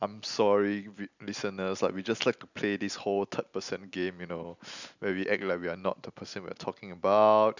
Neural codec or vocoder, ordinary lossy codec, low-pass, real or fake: none; none; 7.2 kHz; real